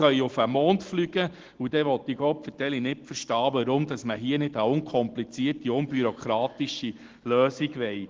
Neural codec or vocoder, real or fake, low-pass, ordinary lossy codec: none; real; 7.2 kHz; Opus, 16 kbps